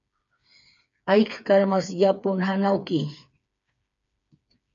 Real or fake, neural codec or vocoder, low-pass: fake; codec, 16 kHz, 4 kbps, FreqCodec, smaller model; 7.2 kHz